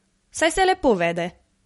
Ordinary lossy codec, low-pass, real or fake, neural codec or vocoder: MP3, 48 kbps; 19.8 kHz; real; none